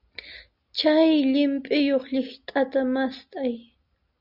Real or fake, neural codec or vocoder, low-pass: real; none; 5.4 kHz